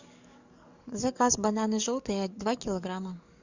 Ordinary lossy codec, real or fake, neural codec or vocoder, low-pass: Opus, 64 kbps; fake; codec, 44.1 kHz, 7.8 kbps, DAC; 7.2 kHz